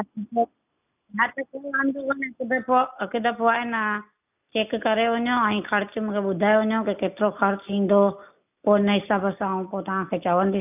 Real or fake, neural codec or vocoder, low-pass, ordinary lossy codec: real; none; 3.6 kHz; none